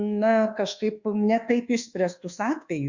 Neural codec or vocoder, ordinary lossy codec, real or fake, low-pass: codec, 24 kHz, 1.2 kbps, DualCodec; Opus, 64 kbps; fake; 7.2 kHz